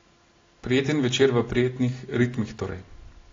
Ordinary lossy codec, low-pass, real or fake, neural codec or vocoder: AAC, 32 kbps; 7.2 kHz; real; none